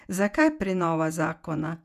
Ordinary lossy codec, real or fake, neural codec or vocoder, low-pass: none; fake; vocoder, 48 kHz, 128 mel bands, Vocos; 14.4 kHz